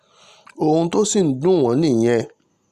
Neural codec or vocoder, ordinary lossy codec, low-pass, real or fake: none; Opus, 64 kbps; 14.4 kHz; real